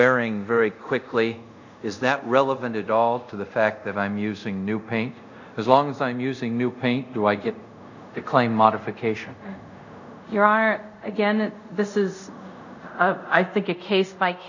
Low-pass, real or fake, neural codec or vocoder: 7.2 kHz; fake; codec, 24 kHz, 0.5 kbps, DualCodec